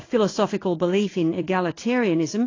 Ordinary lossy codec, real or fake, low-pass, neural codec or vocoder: AAC, 32 kbps; fake; 7.2 kHz; codec, 16 kHz in and 24 kHz out, 1 kbps, XY-Tokenizer